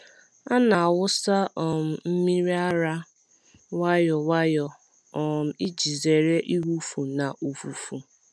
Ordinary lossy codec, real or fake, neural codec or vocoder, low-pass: none; real; none; none